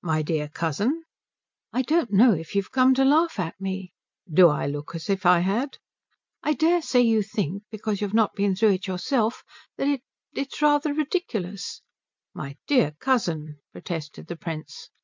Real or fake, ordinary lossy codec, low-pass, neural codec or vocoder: real; MP3, 64 kbps; 7.2 kHz; none